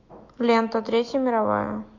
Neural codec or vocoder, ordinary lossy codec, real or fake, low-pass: autoencoder, 48 kHz, 128 numbers a frame, DAC-VAE, trained on Japanese speech; AAC, 48 kbps; fake; 7.2 kHz